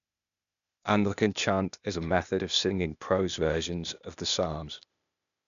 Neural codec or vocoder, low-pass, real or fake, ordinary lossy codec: codec, 16 kHz, 0.8 kbps, ZipCodec; 7.2 kHz; fake; none